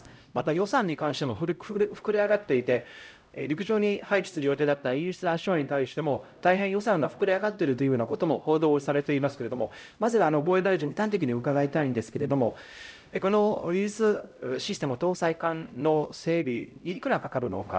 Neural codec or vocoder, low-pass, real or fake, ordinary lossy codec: codec, 16 kHz, 0.5 kbps, X-Codec, HuBERT features, trained on LibriSpeech; none; fake; none